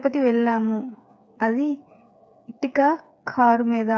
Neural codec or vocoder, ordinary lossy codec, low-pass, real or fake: codec, 16 kHz, 4 kbps, FreqCodec, smaller model; none; none; fake